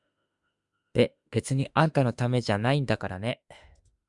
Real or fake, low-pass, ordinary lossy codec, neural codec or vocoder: fake; 10.8 kHz; Opus, 64 kbps; autoencoder, 48 kHz, 32 numbers a frame, DAC-VAE, trained on Japanese speech